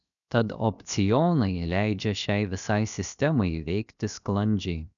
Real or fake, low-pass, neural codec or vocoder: fake; 7.2 kHz; codec, 16 kHz, 0.7 kbps, FocalCodec